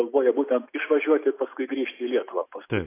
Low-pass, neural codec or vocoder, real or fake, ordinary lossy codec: 3.6 kHz; none; real; AAC, 24 kbps